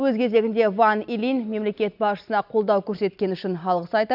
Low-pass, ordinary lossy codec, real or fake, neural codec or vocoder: 5.4 kHz; none; real; none